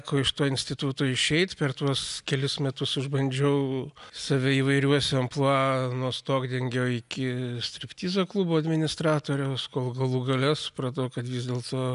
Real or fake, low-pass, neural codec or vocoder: real; 10.8 kHz; none